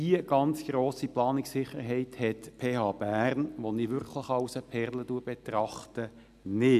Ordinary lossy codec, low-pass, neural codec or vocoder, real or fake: none; 14.4 kHz; none; real